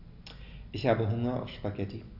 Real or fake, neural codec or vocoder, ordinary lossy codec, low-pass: real; none; none; 5.4 kHz